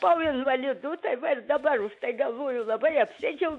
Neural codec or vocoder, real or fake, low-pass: autoencoder, 48 kHz, 128 numbers a frame, DAC-VAE, trained on Japanese speech; fake; 10.8 kHz